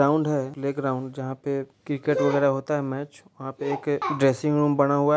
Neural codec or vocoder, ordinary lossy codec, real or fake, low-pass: none; none; real; none